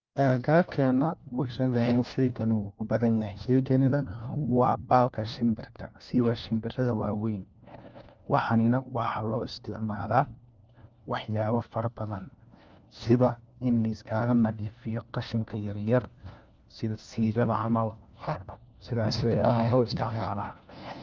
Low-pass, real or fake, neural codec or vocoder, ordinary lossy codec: 7.2 kHz; fake; codec, 16 kHz, 1 kbps, FunCodec, trained on LibriTTS, 50 frames a second; Opus, 24 kbps